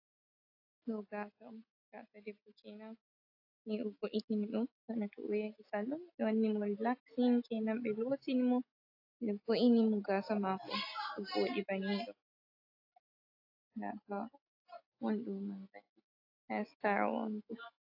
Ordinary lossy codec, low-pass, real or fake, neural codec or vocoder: AAC, 32 kbps; 5.4 kHz; real; none